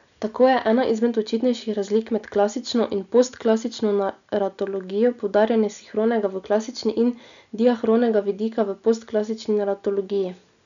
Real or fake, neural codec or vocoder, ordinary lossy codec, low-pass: real; none; none; 7.2 kHz